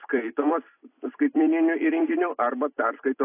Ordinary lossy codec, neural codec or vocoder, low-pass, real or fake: AAC, 24 kbps; vocoder, 44.1 kHz, 128 mel bands every 256 samples, BigVGAN v2; 3.6 kHz; fake